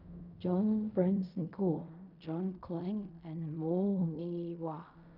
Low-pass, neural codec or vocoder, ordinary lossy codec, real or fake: 5.4 kHz; codec, 16 kHz in and 24 kHz out, 0.4 kbps, LongCat-Audio-Codec, fine tuned four codebook decoder; none; fake